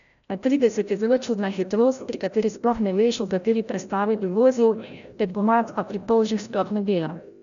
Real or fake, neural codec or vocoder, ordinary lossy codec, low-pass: fake; codec, 16 kHz, 0.5 kbps, FreqCodec, larger model; none; 7.2 kHz